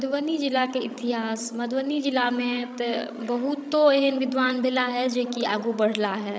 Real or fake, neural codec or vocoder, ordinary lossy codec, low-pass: fake; codec, 16 kHz, 16 kbps, FreqCodec, larger model; none; none